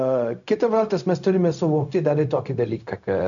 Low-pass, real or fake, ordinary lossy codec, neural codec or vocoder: 7.2 kHz; fake; MP3, 96 kbps; codec, 16 kHz, 0.4 kbps, LongCat-Audio-Codec